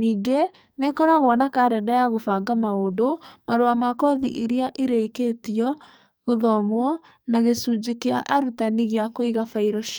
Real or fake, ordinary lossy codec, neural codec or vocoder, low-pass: fake; none; codec, 44.1 kHz, 2.6 kbps, SNAC; none